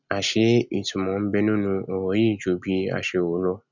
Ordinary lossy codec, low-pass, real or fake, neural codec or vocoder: none; 7.2 kHz; real; none